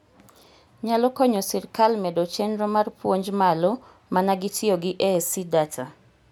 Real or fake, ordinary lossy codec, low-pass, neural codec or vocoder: real; none; none; none